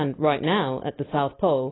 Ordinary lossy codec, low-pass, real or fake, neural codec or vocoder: AAC, 16 kbps; 7.2 kHz; real; none